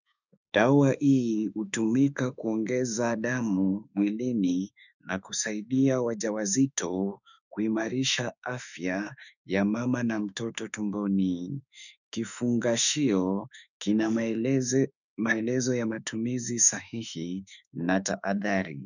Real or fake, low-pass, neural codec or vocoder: fake; 7.2 kHz; autoencoder, 48 kHz, 32 numbers a frame, DAC-VAE, trained on Japanese speech